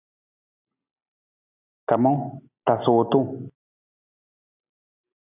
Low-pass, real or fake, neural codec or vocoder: 3.6 kHz; real; none